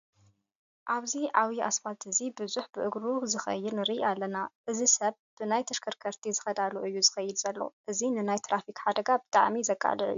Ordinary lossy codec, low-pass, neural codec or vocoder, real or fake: AAC, 96 kbps; 7.2 kHz; none; real